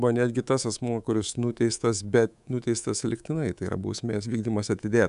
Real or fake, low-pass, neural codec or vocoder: fake; 10.8 kHz; codec, 24 kHz, 3.1 kbps, DualCodec